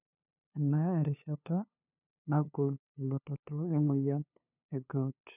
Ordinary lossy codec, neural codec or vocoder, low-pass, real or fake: none; codec, 16 kHz, 2 kbps, FunCodec, trained on LibriTTS, 25 frames a second; 3.6 kHz; fake